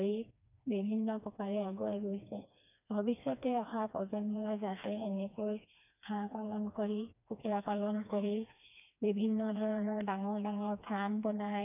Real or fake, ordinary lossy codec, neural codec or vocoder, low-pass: fake; none; codec, 16 kHz, 2 kbps, FreqCodec, smaller model; 3.6 kHz